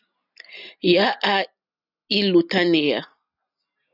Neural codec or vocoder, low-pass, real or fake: none; 5.4 kHz; real